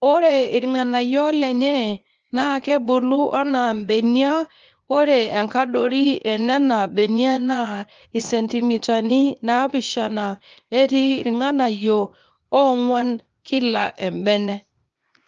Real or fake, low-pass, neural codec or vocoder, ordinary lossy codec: fake; 7.2 kHz; codec, 16 kHz, 0.8 kbps, ZipCodec; Opus, 24 kbps